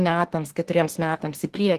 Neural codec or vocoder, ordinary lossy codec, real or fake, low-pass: autoencoder, 48 kHz, 32 numbers a frame, DAC-VAE, trained on Japanese speech; Opus, 16 kbps; fake; 14.4 kHz